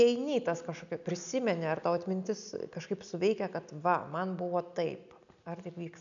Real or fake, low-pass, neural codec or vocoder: real; 7.2 kHz; none